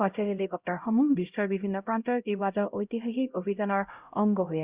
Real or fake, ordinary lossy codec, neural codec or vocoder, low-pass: fake; Opus, 64 kbps; codec, 16 kHz, 0.5 kbps, X-Codec, HuBERT features, trained on LibriSpeech; 3.6 kHz